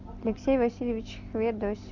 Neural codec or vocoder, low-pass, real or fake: none; 7.2 kHz; real